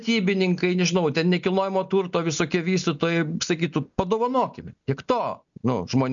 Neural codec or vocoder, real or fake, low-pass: none; real; 7.2 kHz